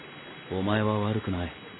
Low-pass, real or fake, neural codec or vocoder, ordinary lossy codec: 7.2 kHz; real; none; AAC, 16 kbps